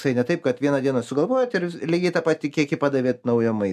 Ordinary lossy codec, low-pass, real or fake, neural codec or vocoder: MP3, 96 kbps; 14.4 kHz; real; none